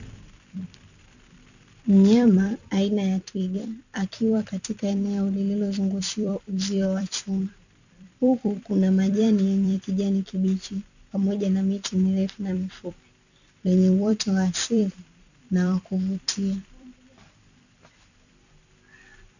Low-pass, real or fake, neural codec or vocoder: 7.2 kHz; fake; vocoder, 44.1 kHz, 128 mel bands every 256 samples, BigVGAN v2